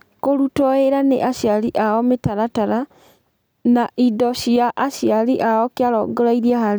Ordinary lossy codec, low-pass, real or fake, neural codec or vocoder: none; none; real; none